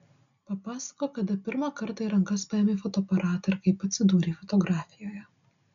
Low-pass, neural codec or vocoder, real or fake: 7.2 kHz; none; real